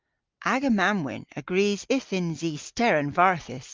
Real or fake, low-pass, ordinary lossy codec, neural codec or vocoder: real; 7.2 kHz; Opus, 32 kbps; none